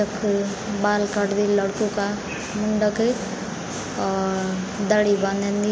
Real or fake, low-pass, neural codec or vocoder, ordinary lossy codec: real; none; none; none